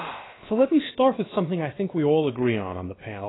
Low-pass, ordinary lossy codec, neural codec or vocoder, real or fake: 7.2 kHz; AAC, 16 kbps; codec, 16 kHz, about 1 kbps, DyCAST, with the encoder's durations; fake